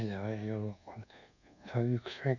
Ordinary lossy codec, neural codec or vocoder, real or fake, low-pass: none; codec, 24 kHz, 1.2 kbps, DualCodec; fake; 7.2 kHz